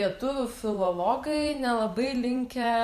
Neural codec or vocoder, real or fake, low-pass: vocoder, 44.1 kHz, 128 mel bands every 512 samples, BigVGAN v2; fake; 14.4 kHz